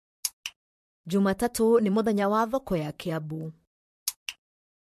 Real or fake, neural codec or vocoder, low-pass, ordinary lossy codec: fake; vocoder, 44.1 kHz, 128 mel bands, Pupu-Vocoder; 14.4 kHz; MP3, 64 kbps